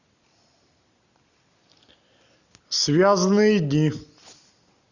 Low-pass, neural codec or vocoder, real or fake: 7.2 kHz; none; real